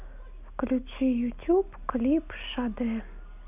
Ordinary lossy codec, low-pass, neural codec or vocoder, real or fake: none; 3.6 kHz; none; real